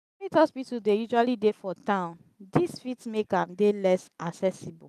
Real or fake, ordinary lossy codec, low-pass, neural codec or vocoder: real; none; 14.4 kHz; none